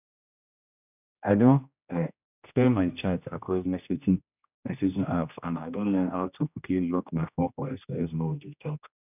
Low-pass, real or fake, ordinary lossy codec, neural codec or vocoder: 3.6 kHz; fake; none; codec, 16 kHz, 1 kbps, X-Codec, HuBERT features, trained on general audio